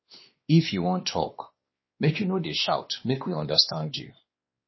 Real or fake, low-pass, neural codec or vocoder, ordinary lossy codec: fake; 7.2 kHz; codec, 16 kHz, 2 kbps, X-Codec, WavLM features, trained on Multilingual LibriSpeech; MP3, 24 kbps